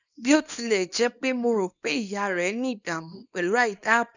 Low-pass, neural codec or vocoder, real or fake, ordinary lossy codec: 7.2 kHz; codec, 24 kHz, 0.9 kbps, WavTokenizer, small release; fake; none